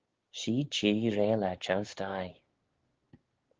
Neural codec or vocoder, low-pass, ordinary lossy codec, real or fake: codec, 16 kHz, 6 kbps, DAC; 7.2 kHz; Opus, 16 kbps; fake